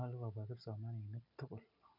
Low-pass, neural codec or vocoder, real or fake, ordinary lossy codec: 5.4 kHz; none; real; none